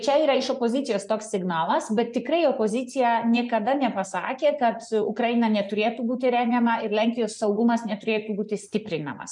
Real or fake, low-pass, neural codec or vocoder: fake; 10.8 kHz; vocoder, 24 kHz, 100 mel bands, Vocos